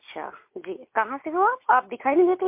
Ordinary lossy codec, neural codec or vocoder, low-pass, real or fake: MP3, 24 kbps; none; 3.6 kHz; real